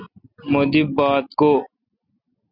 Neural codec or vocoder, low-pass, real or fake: none; 5.4 kHz; real